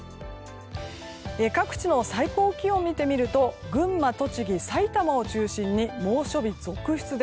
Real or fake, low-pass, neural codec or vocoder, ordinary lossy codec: real; none; none; none